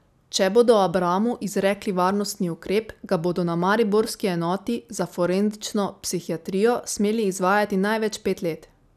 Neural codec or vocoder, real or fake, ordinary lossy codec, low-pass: none; real; none; 14.4 kHz